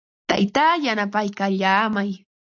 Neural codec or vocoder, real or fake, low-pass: none; real; 7.2 kHz